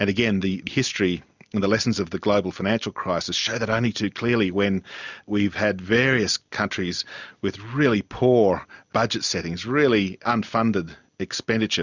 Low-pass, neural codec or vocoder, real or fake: 7.2 kHz; none; real